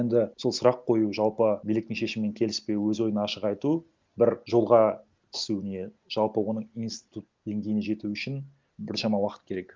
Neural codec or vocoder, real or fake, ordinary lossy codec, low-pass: none; real; Opus, 24 kbps; 7.2 kHz